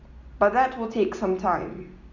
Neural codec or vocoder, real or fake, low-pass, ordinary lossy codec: none; real; 7.2 kHz; none